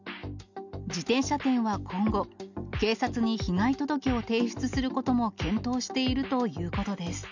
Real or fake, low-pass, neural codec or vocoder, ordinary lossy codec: real; 7.2 kHz; none; none